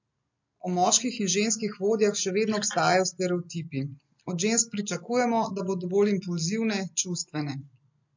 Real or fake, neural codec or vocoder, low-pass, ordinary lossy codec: real; none; 7.2 kHz; MP3, 48 kbps